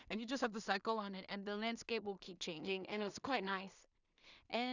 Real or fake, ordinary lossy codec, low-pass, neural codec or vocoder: fake; none; 7.2 kHz; codec, 16 kHz in and 24 kHz out, 0.4 kbps, LongCat-Audio-Codec, two codebook decoder